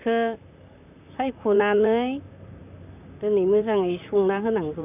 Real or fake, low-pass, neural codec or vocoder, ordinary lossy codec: fake; 3.6 kHz; vocoder, 44.1 kHz, 128 mel bands, Pupu-Vocoder; none